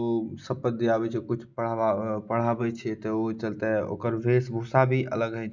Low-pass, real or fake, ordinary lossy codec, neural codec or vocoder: 7.2 kHz; real; none; none